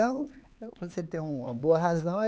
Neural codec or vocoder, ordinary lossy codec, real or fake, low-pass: codec, 16 kHz, 4 kbps, X-Codec, HuBERT features, trained on LibriSpeech; none; fake; none